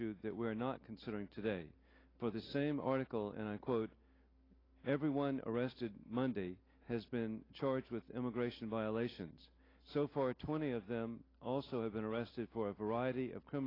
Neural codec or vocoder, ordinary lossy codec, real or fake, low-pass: none; AAC, 24 kbps; real; 5.4 kHz